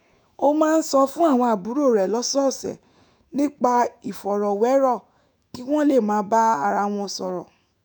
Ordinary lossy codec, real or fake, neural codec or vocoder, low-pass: none; fake; autoencoder, 48 kHz, 128 numbers a frame, DAC-VAE, trained on Japanese speech; none